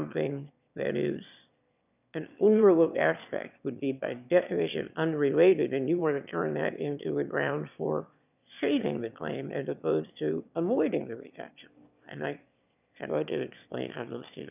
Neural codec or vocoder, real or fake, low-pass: autoencoder, 22.05 kHz, a latent of 192 numbers a frame, VITS, trained on one speaker; fake; 3.6 kHz